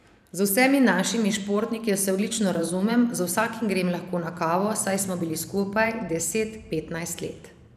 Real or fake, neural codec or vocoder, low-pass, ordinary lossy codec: fake; vocoder, 44.1 kHz, 128 mel bands every 512 samples, BigVGAN v2; 14.4 kHz; none